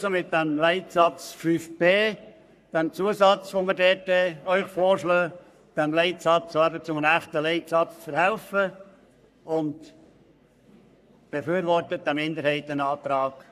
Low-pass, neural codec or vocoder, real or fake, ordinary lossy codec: 14.4 kHz; codec, 44.1 kHz, 3.4 kbps, Pupu-Codec; fake; none